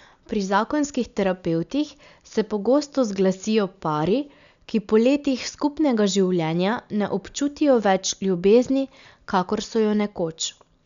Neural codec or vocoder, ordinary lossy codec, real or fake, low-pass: none; none; real; 7.2 kHz